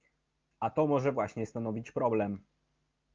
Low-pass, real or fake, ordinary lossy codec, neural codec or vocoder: 7.2 kHz; real; Opus, 24 kbps; none